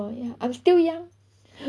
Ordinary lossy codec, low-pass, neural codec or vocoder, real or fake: none; none; none; real